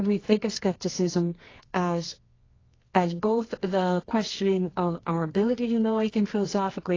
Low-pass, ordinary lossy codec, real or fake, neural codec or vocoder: 7.2 kHz; AAC, 32 kbps; fake; codec, 24 kHz, 0.9 kbps, WavTokenizer, medium music audio release